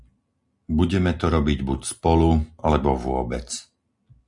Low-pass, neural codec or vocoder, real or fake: 10.8 kHz; none; real